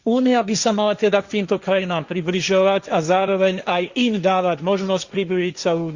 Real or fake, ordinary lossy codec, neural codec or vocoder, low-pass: fake; Opus, 64 kbps; codec, 16 kHz, 1.1 kbps, Voila-Tokenizer; 7.2 kHz